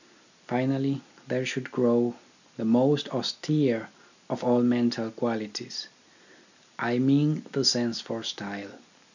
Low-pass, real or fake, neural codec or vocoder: 7.2 kHz; real; none